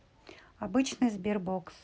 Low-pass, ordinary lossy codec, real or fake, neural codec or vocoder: none; none; real; none